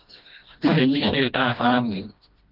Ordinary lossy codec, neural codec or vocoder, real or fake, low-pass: Opus, 32 kbps; codec, 16 kHz, 1 kbps, FreqCodec, smaller model; fake; 5.4 kHz